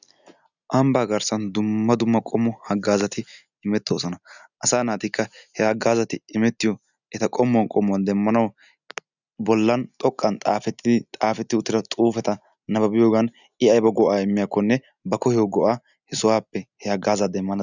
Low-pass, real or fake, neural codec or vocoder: 7.2 kHz; real; none